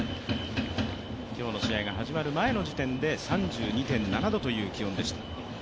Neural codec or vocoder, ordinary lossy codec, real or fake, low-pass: none; none; real; none